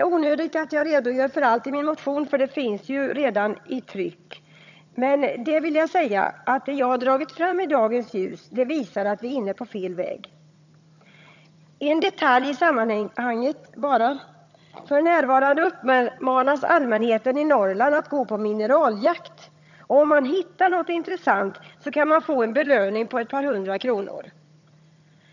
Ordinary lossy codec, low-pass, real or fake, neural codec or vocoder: none; 7.2 kHz; fake; vocoder, 22.05 kHz, 80 mel bands, HiFi-GAN